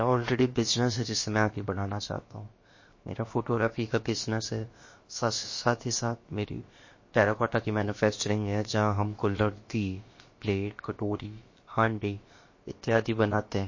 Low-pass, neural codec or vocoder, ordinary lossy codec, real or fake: 7.2 kHz; codec, 16 kHz, about 1 kbps, DyCAST, with the encoder's durations; MP3, 32 kbps; fake